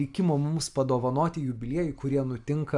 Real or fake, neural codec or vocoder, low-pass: real; none; 10.8 kHz